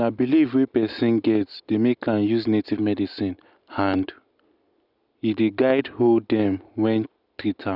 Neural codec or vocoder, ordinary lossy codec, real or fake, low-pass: none; none; real; 5.4 kHz